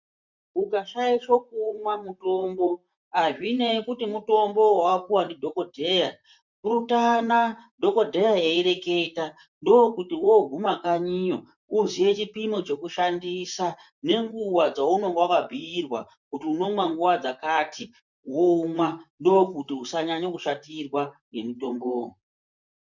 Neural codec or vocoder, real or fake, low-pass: vocoder, 44.1 kHz, 128 mel bands, Pupu-Vocoder; fake; 7.2 kHz